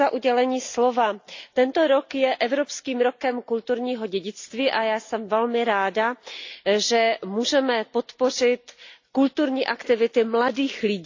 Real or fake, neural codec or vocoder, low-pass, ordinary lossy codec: real; none; 7.2 kHz; AAC, 48 kbps